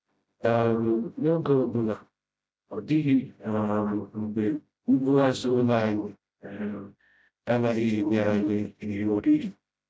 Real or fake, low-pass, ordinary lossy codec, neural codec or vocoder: fake; none; none; codec, 16 kHz, 0.5 kbps, FreqCodec, smaller model